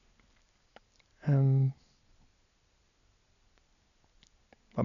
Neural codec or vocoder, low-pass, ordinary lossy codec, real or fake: none; 7.2 kHz; none; real